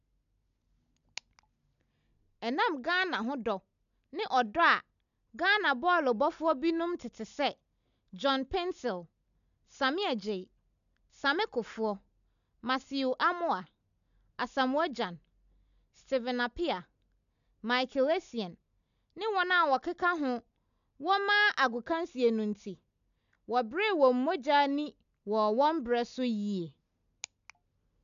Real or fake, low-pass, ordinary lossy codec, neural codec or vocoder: real; 7.2 kHz; none; none